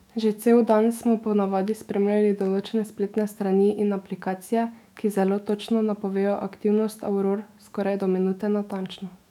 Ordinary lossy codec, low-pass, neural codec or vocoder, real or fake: none; 19.8 kHz; autoencoder, 48 kHz, 128 numbers a frame, DAC-VAE, trained on Japanese speech; fake